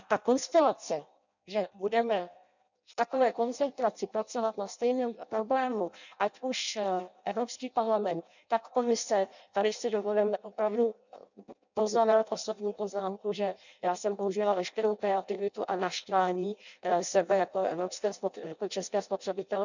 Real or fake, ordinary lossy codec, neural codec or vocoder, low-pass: fake; none; codec, 16 kHz in and 24 kHz out, 0.6 kbps, FireRedTTS-2 codec; 7.2 kHz